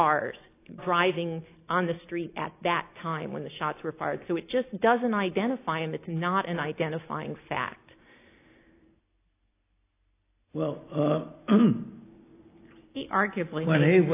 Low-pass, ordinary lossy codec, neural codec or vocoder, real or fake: 3.6 kHz; AAC, 24 kbps; none; real